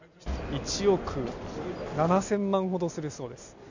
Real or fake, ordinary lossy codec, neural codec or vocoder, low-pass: real; none; none; 7.2 kHz